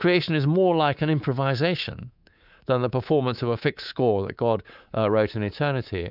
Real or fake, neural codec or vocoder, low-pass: fake; codec, 24 kHz, 3.1 kbps, DualCodec; 5.4 kHz